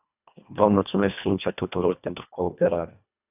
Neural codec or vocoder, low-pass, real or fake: codec, 24 kHz, 1.5 kbps, HILCodec; 3.6 kHz; fake